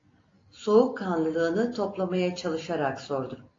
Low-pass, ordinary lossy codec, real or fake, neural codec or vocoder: 7.2 kHz; AAC, 32 kbps; real; none